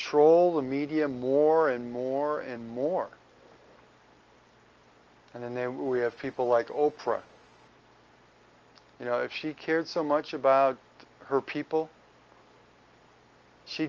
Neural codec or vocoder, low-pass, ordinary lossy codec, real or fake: none; 7.2 kHz; Opus, 24 kbps; real